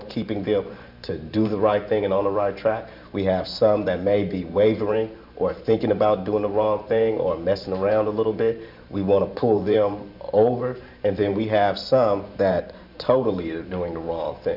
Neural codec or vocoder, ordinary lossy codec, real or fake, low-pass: vocoder, 44.1 kHz, 128 mel bands every 512 samples, BigVGAN v2; MP3, 48 kbps; fake; 5.4 kHz